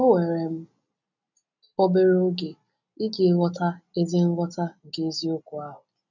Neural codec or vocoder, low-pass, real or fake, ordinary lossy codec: none; 7.2 kHz; real; none